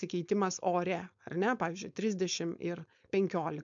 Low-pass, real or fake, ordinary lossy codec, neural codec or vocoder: 7.2 kHz; fake; MP3, 64 kbps; codec, 16 kHz, 4.8 kbps, FACodec